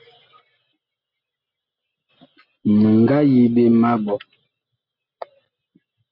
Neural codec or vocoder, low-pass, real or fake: none; 5.4 kHz; real